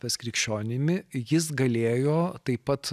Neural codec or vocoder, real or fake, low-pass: none; real; 14.4 kHz